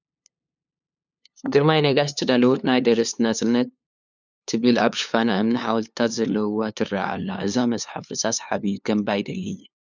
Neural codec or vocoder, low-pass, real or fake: codec, 16 kHz, 2 kbps, FunCodec, trained on LibriTTS, 25 frames a second; 7.2 kHz; fake